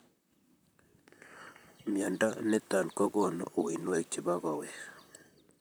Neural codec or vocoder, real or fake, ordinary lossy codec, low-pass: vocoder, 44.1 kHz, 128 mel bands, Pupu-Vocoder; fake; none; none